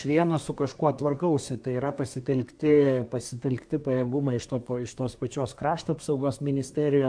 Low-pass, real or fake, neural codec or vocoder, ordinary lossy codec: 9.9 kHz; fake; codec, 24 kHz, 1 kbps, SNAC; AAC, 64 kbps